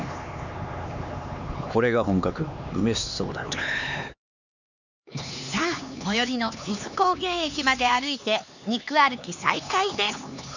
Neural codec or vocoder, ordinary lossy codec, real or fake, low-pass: codec, 16 kHz, 4 kbps, X-Codec, HuBERT features, trained on LibriSpeech; none; fake; 7.2 kHz